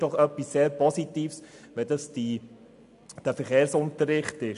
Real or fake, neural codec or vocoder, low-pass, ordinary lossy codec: real; none; 10.8 kHz; AAC, 96 kbps